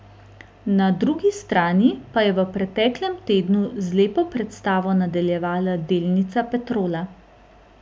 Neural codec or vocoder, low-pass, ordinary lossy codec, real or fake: none; none; none; real